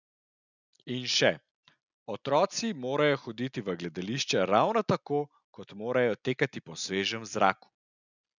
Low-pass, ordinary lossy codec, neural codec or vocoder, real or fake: 7.2 kHz; none; none; real